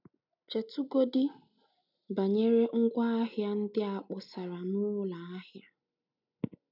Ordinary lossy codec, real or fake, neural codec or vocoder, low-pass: none; real; none; 5.4 kHz